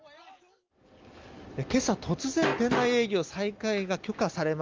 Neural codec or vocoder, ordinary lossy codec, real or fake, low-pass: none; Opus, 32 kbps; real; 7.2 kHz